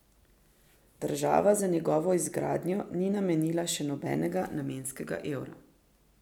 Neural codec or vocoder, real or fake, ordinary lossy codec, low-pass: none; real; none; 19.8 kHz